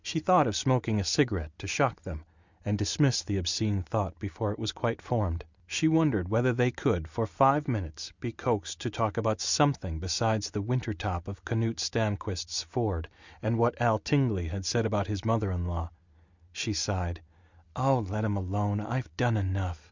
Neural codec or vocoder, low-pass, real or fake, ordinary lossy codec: none; 7.2 kHz; real; Opus, 64 kbps